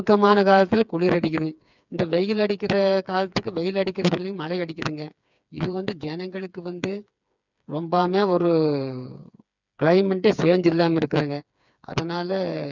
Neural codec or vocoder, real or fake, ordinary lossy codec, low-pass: codec, 16 kHz, 4 kbps, FreqCodec, smaller model; fake; none; 7.2 kHz